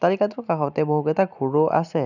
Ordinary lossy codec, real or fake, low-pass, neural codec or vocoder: none; real; 7.2 kHz; none